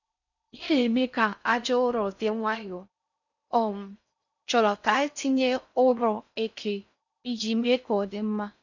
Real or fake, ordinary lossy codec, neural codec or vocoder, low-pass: fake; none; codec, 16 kHz in and 24 kHz out, 0.6 kbps, FocalCodec, streaming, 4096 codes; 7.2 kHz